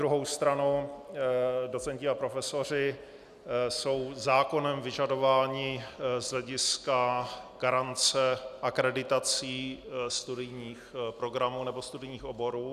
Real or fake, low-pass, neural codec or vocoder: real; 14.4 kHz; none